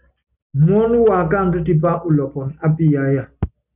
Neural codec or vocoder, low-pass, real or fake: none; 3.6 kHz; real